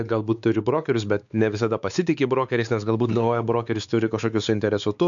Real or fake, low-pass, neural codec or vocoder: fake; 7.2 kHz; codec, 16 kHz, 4 kbps, X-Codec, WavLM features, trained on Multilingual LibriSpeech